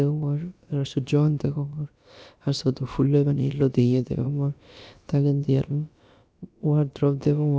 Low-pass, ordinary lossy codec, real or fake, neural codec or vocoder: none; none; fake; codec, 16 kHz, about 1 kbps, DyCAST, with the encoder's durations